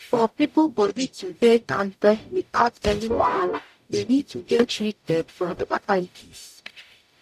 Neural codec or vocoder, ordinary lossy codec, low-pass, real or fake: codec, 44.1 kHz, 0.9 kbps, DAC; none; 14.4 kHz; fake